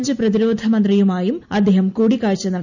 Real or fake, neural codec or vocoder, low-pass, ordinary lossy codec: real; none; 7.2 kHz; none